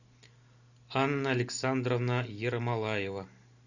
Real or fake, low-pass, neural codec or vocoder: real; 7.2 kHz; none